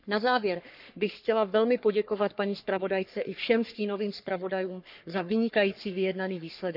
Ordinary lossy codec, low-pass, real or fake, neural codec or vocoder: none; 5.4 kHz; fake; codec, 44.1 kHz, 3.4 kbps, Pupu-Codec